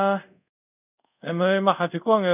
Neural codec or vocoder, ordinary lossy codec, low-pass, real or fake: codec, 24 kHz, 0.5 kbps, DualCodec; none; 3.6 kHz; fake